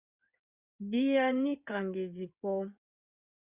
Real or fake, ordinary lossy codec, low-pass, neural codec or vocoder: fake; Opus, 64 kbps; 3.6 kHz; codec, 16 kHz in and 24 kHz out, 2.2 kbps, FireRedTTS-2 codec